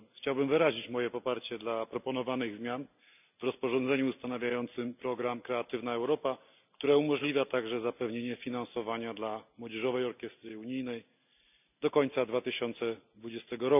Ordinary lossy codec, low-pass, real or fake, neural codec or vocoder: none; 3.6 kHz; real; none